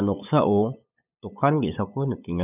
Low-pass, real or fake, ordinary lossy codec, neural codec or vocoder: 3.6 kHz; fake; none; codec, 16 kHz, 8 kbps, FunCodec, trained on LibriTTS, 25 frames a second